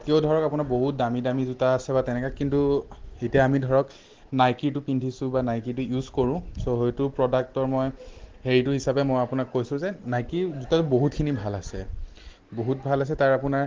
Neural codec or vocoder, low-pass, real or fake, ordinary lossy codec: none; 7.2 kHz; real; Opus, 16 kbps